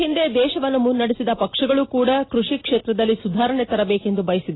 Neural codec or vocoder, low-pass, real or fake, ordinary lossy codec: none; 7.2 kHz; real; AAC, 16 kbps